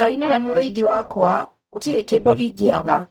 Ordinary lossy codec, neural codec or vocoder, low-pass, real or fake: none; codec, 44.1 kHz, 0.9 kbps, DAC; 19.8 kHz; fake